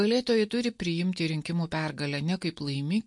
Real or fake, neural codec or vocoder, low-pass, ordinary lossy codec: real; none; 10.8 kHz; MP3, 48 kbps